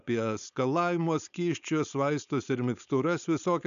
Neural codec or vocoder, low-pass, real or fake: codec, 16 kHz, 4.8 kbps, FACodec; 7.2 kHz; fake